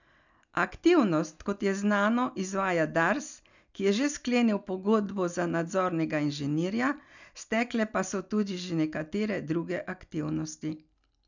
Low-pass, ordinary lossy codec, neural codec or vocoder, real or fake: 7.2 kHz; none; none; real